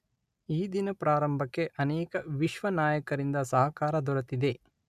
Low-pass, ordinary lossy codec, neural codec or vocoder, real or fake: 14.4 kHz; none; none; real